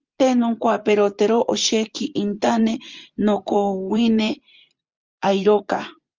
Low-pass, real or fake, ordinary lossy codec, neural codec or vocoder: 7.2 kHz; fake; Opus, 24 kbps; vocoder, 24 kHz, 100 mel bands, Vocos